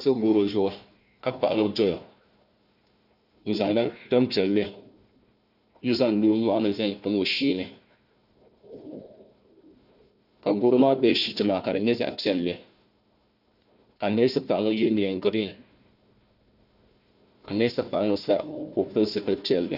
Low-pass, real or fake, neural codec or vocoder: 5.4 kHz; fake; codec, 16 kHz, 1 kbps, FunCodec, trained on Chinese and English, 50 frames a second